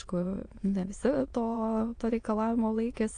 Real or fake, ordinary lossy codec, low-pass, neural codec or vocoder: fake; AAC, 48 kbps; 9.9 kHz; autoencoder, 22.05 kHz, a latent of 192 numbers a frame, VITS, trained on many speakers